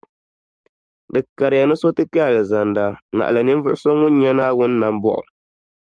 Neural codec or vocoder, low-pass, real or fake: codec, 44.1 kHz, 7.8 kbps, DAC; 9.9 kHz; fake